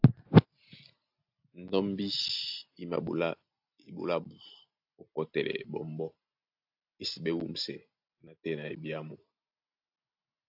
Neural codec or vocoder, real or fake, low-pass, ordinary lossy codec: none; real; 5.4 kHz; AAC, 48 kbps